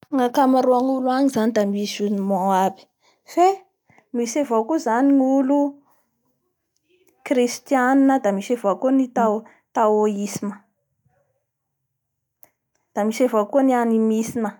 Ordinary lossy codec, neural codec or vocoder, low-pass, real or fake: none; none; 19.8 kHz; real